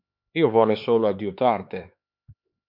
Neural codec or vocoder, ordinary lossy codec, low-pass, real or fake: codec, 16 kHz, 4 kbps, X-Codec, HuBERT features, trained on LibriSpeech; MP3, 48 kbps; 5.4 kHz; fake